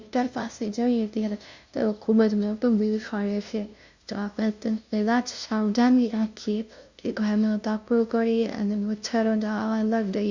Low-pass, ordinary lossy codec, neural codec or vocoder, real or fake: 7.2 kHz; Opus, 64 kbps; codec, 16 kHz, 0.5 kbps, FunCodec, trained on LibriTTS, 25 frames a second; fake